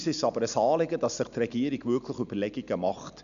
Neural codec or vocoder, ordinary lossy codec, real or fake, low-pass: none; none; real; 7.2 kHz